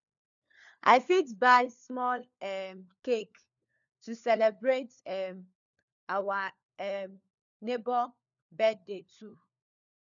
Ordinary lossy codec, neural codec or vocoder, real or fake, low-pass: none; codec, 16 kHz, 4 kbps, FunCodec, trained on LibriTTS, 50 frames a second; fake; 7.2 kHz